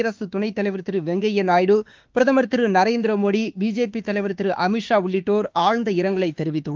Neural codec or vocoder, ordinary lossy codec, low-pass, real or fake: autoencoder, 48 kHz, 32 numbers a frame, DAC-VAE, trained on Japanese speech; Opus, 32 kbps; 7.2 kHz; fake